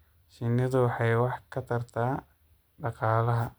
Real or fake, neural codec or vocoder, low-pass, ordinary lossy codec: real; none; none; none